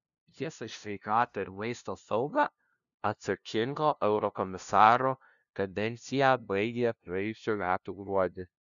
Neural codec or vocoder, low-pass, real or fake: codec, 16 kHz, 0.5 kbps, FunCodec, trained on LibriTTS, 25 frames a second; 7.2 kHz; fake